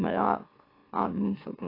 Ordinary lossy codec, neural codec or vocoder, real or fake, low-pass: AAC, 24 kbps; autoencoder, 44.1 kHz, a latent of 192 numbers a frame, MeloTTS; fake; 5.4 kHz